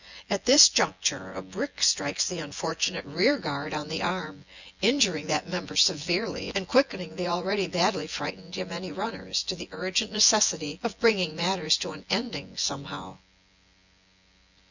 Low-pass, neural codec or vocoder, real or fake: 7.2 kHz; vocoder, 24 kHz, 100 mel bands, Vocos; fake